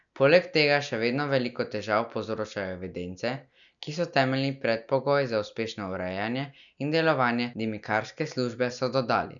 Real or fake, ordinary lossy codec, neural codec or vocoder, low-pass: real; none; none; 7.2 kHz